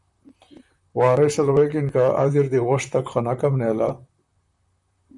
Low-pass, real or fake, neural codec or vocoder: 10.8 kHz; fake; vocoder, 44.1 kHz, 128 mel bands, Pupu-Vocoder